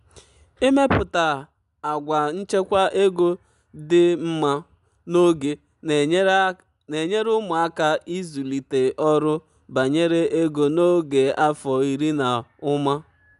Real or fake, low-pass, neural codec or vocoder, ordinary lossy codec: real; 10.8 kHz; none; none